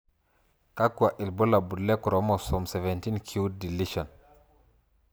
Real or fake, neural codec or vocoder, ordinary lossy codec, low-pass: real; none; none; none